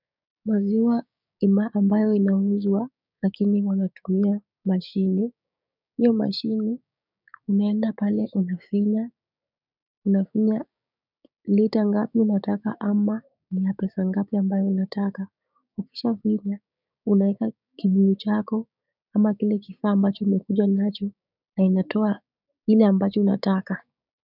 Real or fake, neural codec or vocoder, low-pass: fake; codec, 16 kHz, 6 kbps, DAC; 5.4 kHz